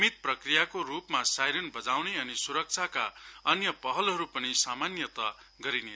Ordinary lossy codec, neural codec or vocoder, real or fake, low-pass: none; none; real; none